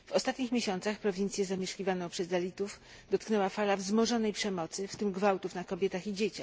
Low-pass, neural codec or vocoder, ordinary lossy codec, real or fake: none; none; none; real